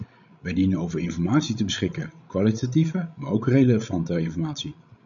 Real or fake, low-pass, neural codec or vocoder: fake; 7.2 kHz; codec, 16 kHz, 16 kbps, FreqCodec, larger model